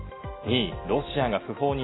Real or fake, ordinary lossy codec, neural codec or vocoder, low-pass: real; AAC, 16 kbps; none; 7.2 kHz